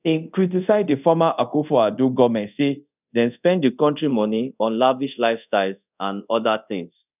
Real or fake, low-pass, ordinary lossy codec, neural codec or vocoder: fake; 3.6 kHz; none; codec, 24 kHz, 0.5 kbps, DualCodec